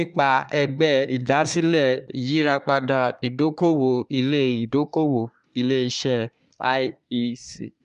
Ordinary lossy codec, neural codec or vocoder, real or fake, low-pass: MP3, 96 kbps; codec, 24 kHz, 1 kbps, SNAC; fake; 10.8 kHz